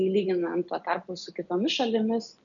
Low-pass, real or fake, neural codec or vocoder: 7.2 kHz; real; none